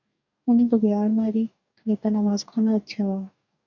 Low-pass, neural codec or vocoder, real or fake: 7.2 kHz; codec, 44.1 kHz, 2.6 kbps, DAC; fake